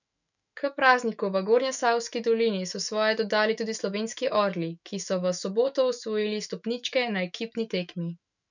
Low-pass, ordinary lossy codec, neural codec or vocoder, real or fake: 7.2 kHz; none; autoencoder, 48 kHz, 128 numbers a frame, DAC-VAE, trained on Japanese speech; fake